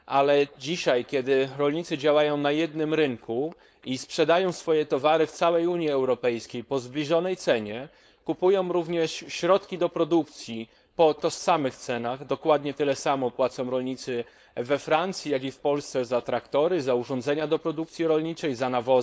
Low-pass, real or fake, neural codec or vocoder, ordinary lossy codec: none; fake; codec, 16 kHz, 4.8 kbps, FACodec; none